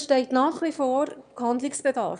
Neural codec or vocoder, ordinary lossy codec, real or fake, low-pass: autoencoder, 22.05 kHz, a latent of 192 numbers a frame, VITS, trained on one speaker; none; fake; 9.9 kHz